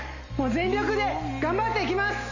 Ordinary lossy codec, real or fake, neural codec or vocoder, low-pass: Opus, 64 kbps; real; none; 7.2 kHz